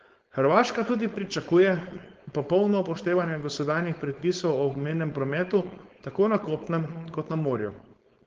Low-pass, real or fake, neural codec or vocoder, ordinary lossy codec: 7.2 kHz; fake; codec, 16 kHz, 4.8 kbps, FACodec; Opus, 16 kbps